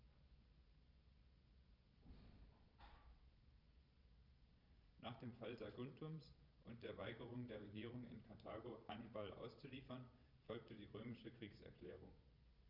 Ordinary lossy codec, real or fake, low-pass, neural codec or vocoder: none; fake; 5.4 kHz; vocoder, 22.05 kHz, 80 mel bands, Vocos